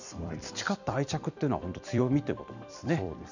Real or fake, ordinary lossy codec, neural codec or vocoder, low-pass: fake; none; vocoder, 44.1 kHz, 128 mel bands every 256 samples, BigVGAN v2; 7.2 kHz